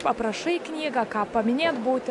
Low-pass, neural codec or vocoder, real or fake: 10.8 kHz; none; real